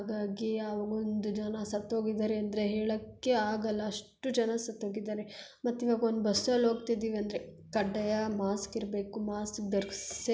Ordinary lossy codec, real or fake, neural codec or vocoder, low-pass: none; real; none; none